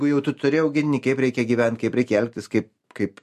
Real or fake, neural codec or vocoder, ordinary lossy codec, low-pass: real; none; MP3, 64 kbps; 14.4 kHz